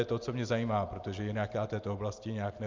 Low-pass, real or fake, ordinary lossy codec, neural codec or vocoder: 7.2 kHz; real; Opus, 32 kbps; none